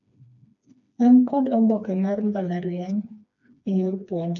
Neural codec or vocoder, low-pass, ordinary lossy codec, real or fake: codec, 16 kHz, 2 kbps, FreqCodec, smaller model; 7.2 kHz; none; fake